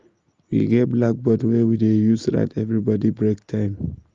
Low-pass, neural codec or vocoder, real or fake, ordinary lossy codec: 7.2 kHz; none; real; Opus, 32 kbps